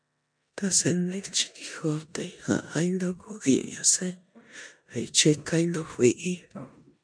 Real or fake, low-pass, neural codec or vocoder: fake; 9.9 kHz; codec, 16 kHz in and 24 kHz out, 0.9 kbps, LongCat-Audio-Codec, four codebook decoder